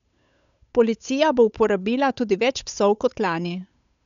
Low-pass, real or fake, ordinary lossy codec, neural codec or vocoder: 7.2 kHz; fake; none; codec, 16 kHz, 8 kbps, FunCodec, trained on Chinese and English, 25 frames a second